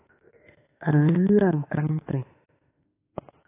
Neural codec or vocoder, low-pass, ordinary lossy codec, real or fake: autoencoder, 48 kHz, 32 numbers a frame, DAC-VAE, trained on Japanese speech; 3.6 kHz; AAC, 16 kbps; fake